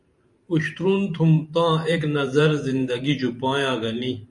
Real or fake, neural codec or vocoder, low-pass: fake; vocoder, 44.1 kHz, 128 mel bands every 512 samples, BigVGAN v2; 10.8 kHz